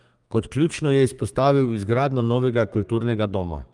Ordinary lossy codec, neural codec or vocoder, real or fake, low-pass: Opus, 32 kbps; codec, 32 kHz, 1.9 kbps, SNAC; fake; 10.8 kHz